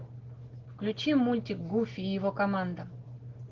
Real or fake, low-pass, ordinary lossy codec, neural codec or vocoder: real; 7.2 kHz; Opus, 16 kbps; none